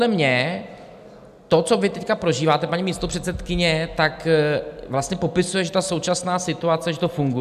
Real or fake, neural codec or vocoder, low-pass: real; none; 14.4 kHz